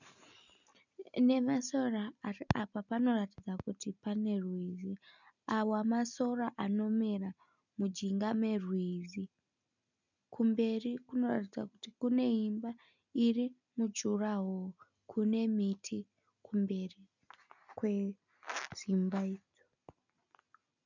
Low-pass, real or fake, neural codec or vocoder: 7.2 kHz; real; none